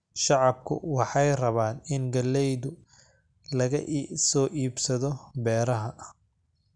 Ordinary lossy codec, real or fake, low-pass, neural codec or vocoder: none; real; 9.9 kHz; none